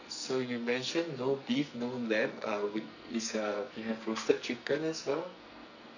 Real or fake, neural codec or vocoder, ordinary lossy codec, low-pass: fake; codec, 44.1 kHz, 2.6 kbps, SNAC; none; 7.2 kHz